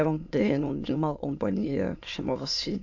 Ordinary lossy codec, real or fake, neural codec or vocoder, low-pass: AAC, 48 kbps; fake; autoencoder, 22.05 kHz, a latent of 192 numbers a frame, VITS, trained on many speakers; 7.2 kHz